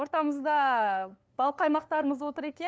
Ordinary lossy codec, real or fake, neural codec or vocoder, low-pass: none; fake; codec, 16 kHz, 2 kbps, FunCodec, trained on LibriTTS, 25 frames a second; none